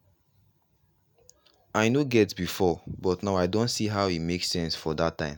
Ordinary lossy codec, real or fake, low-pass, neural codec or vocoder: none; real; none; none